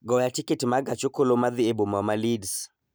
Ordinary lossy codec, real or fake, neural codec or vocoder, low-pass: none; real; none; none